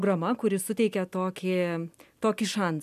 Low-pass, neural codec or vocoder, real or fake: 14.4 kHz; none; real